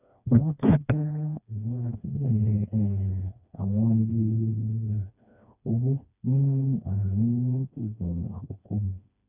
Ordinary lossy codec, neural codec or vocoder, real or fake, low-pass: none; codec, 16 kHz, 2 kbps, FreqCodec, smaller model; fake; 3.6 kHz